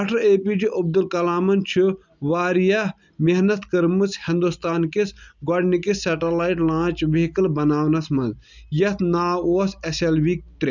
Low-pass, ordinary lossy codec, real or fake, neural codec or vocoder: 7.2 kHz; none; real; none